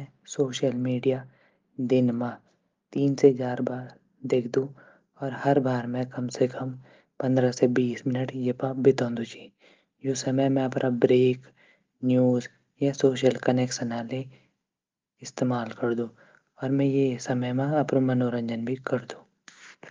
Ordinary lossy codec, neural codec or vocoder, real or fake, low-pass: Opus, 32 kbps; none; real; 7.2 kHz